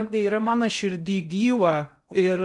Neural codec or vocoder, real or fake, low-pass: codec, 16 kHz in and 24 kHz out, 0.6 kbps, FocalCodec, streaming, 2048 codes; fake; 10.8 kHz